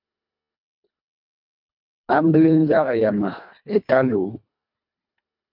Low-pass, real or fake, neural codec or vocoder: 5.4 kHz; fake; codec, 24 kHz, 1.5 kbps, HILCodec